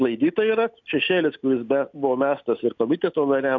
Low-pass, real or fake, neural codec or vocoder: 7.2 kHz; real; none